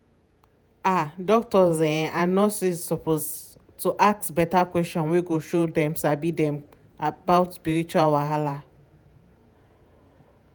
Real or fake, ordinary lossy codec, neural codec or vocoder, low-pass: fake; none; vocoder, 48 kHz, 128 mel bands, Vocos; none